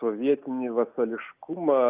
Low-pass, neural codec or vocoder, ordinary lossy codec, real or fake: 3.6 kHz; none; Opus, 64 kbps; real